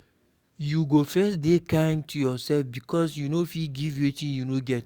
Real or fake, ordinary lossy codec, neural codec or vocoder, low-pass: fake; Opus, 64 kbps; codec, 44.1 kHz, 7.8 kbps, DAC; 19.8 kHz